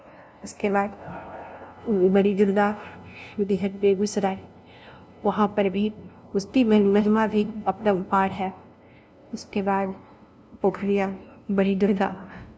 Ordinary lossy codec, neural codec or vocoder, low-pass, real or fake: none; codec, 16 kHz, 0.5 kbps, FunCodec, trained on LibriTTS, 25 frames a second; none; fake